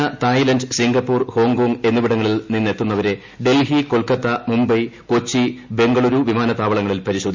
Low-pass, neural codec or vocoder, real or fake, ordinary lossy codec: 7.2 kHz; none; real; MP3, 64 kbps